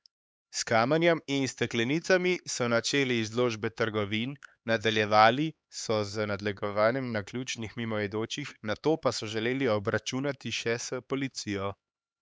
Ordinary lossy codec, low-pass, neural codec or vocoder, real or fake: none; none; codec, 16 kHz, 4 kbps, X-Codec, HuBERT features, trained on LibriSpeech; fake